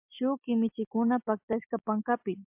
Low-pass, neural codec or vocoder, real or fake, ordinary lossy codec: 3.6 kHz; none; real; MP3, 32 kbps